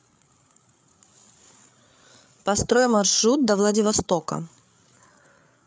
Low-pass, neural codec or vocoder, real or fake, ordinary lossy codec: none; codec, 16 kHz, 8 kbps, FreqCodec, larger model; fake; none